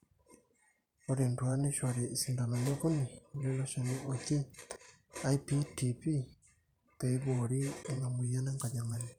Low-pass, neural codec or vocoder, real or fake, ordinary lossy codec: 19.8 kHz; vocoder, 44.1 kHz, 128 mel bands every 512 samples, BigVGAN v2; fake; none